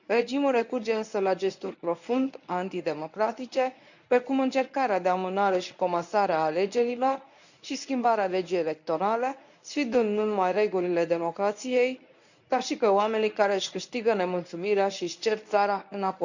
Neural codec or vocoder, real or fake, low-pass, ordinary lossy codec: codec, 24 kHz, 0.9 kbps, WavTokenizer, medium speech release version 1; fake; 7.2 kHz; none